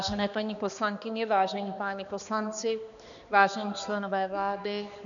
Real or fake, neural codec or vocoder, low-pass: fake; codec, 16 kHz, 2 kbps, X-Codec, HuBERT features, trained on balanced general audio; 7.2 kHz